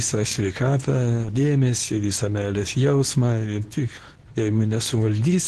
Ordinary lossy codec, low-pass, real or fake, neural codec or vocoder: Opus, 16 kbps; 10.8 kHz; fake; codec, 24 kHz, 0.9 kbps, WavTokenizer, medium speech release version 2